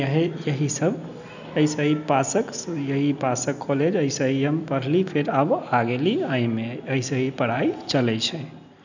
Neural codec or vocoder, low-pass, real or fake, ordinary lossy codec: none; 7.2 kHz; real; none